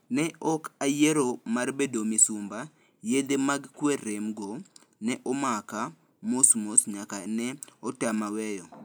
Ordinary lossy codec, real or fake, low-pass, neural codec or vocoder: none; real; none; none